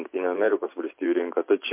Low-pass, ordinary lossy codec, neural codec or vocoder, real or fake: 3.6 kHz; MP3, 24 kbps; vocoder, 44.1 kHz, 128 mel bands every 512 samples, BigVGAN v2; fake